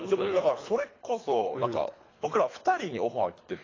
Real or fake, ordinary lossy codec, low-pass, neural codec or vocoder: fake; AAC, 32 kbps; 7.2 kHz; codec, 24 kHz, 3 kbps, HILCodec